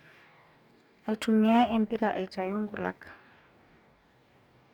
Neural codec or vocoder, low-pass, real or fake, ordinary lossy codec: codec, 44.1 kHz, 2.6 kbps, DAC; 19.8 kHz; fake; none